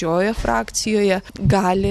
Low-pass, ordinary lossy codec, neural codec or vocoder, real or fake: 14.4 kHz; Opus, 64 kbps; none; real